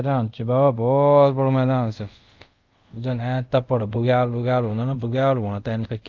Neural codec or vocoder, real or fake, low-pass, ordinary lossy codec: codec, 24 kHz, 0.5 kbps, DualCodec; fake; 7.2 kHz; Opus, 32 kbps